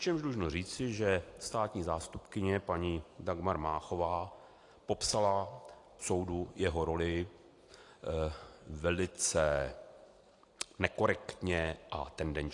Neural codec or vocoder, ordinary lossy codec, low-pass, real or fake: none; AAC, 48 kbps; 10.8 kHz; real